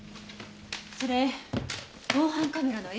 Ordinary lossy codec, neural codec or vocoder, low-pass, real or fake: none; none; none; real